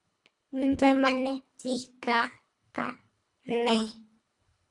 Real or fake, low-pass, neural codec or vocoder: fake; 10.8 kHz; codec, 24 kHz, 1.5 kbps, HILCodec